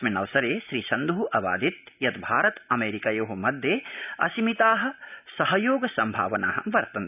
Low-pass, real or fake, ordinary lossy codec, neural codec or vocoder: 3.6 kHz; real; none; none